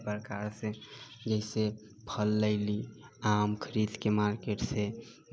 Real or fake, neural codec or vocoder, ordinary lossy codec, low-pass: real; none; none; none